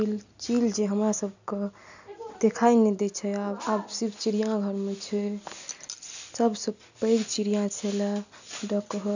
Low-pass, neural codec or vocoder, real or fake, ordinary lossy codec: 7.2 kHz; none; real; none